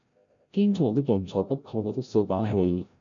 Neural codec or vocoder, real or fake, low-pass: codec, 16 kHz, 0.5 kbps, FreqCodec, larger model; fake; 7.2 kHz